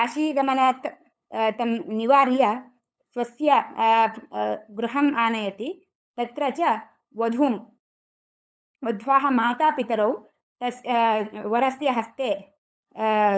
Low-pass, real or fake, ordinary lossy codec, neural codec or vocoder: none; fake; none; codec, 16 kHz, 8 kbps, FunCodec, trained on LibriTTS, 25 frames a second